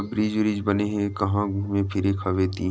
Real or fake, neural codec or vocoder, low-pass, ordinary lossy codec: real; none; none; none